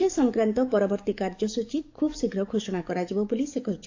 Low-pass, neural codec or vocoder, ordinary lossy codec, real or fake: 7.2 kHz; codec, 44.1 kHz, 7.8 kbps, DAC; AAC, 48 kbps; fake